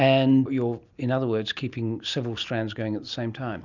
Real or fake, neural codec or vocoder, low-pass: real; none; 7.2 kHz